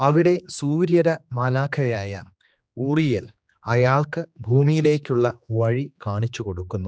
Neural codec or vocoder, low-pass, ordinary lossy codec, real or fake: codec, 16 kHz, 2 kbps, X-Codec, HuBERT features, trained on general audio; none; none; fake